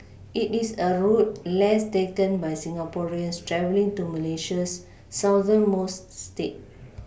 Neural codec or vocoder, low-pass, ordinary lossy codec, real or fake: none; none; none; real